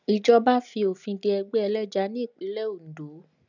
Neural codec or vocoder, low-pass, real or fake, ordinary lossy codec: none; 7.2 kHz; real; none